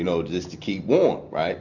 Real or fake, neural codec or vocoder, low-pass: real; none; 7.2 kHz